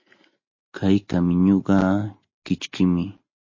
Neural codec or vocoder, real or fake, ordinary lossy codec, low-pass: none; real; MP3, 32 kbps; 7.2 kHz